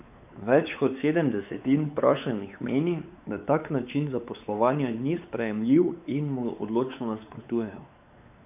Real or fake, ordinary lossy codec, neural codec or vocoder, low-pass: fake; none; codec, 16 kHz, 4 kbps, X-Codec, WavLM features, trained on Multilingual LibriSpeech; 3.6 kHz